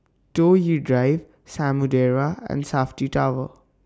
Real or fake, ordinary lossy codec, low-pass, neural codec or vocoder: real; none; none; none